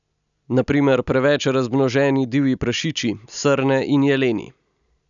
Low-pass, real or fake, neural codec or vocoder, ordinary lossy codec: 7.2 kHz; real; none; none